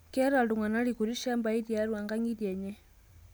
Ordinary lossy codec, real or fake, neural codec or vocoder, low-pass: none; real; none; none